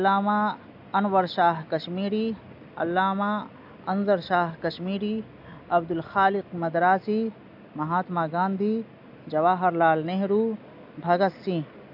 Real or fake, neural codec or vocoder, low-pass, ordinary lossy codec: real; none; 5.4 kHz; AAC, 48 kbps